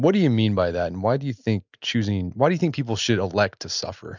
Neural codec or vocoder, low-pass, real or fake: none; 7.2 kHz; real